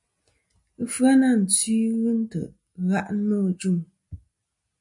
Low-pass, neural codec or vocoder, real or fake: 10.8 kHz; none; real